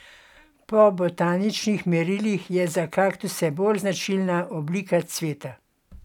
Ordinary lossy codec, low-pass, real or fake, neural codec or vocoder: none; 19.8 kHz; real; none